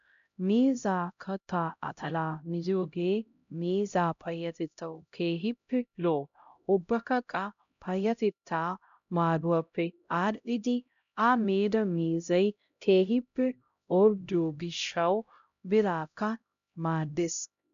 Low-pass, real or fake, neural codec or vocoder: 7.2 kHz; fake; codec, 16 kHz, 0.5 kbps, X-Codec, HuBERT features, trained on LibriSpeech